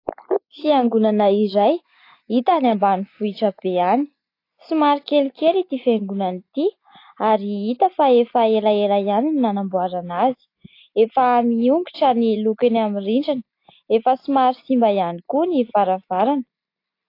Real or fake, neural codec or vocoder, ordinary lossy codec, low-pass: real; none; AAC, 32 kbps; 5.4 kHz